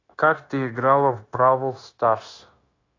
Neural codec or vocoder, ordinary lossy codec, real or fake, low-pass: codec, 16 kHz, 0.9 kbps, LongCat-Audio-Codec; AAC, 32 kbps; fake; 7.2 kHz